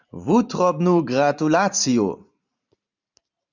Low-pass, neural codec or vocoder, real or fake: 7.2 kHz; vocoder, 44.1 kHz, 128 mel bands every 512 samples, BigVGAN v2; fake